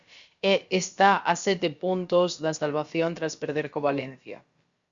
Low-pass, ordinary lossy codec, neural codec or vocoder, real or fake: 7.2 kHz; Opus, 64 kbps; codec, 16 kHz, about 1 kbps, DyCAST, with the encoder's durations; fake